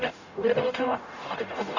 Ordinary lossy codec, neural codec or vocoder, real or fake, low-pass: none; codec, 44.1 kHz, 0.9 kbps, DAC; fake; 7.2 kHz